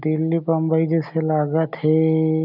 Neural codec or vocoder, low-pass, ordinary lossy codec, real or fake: none; 5.4 kHz; none; real